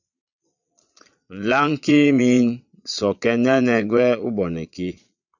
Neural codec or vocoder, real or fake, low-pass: vocoder, 22.05 kHz, 80 mel bands, Vocos; fake; 7.2 kHz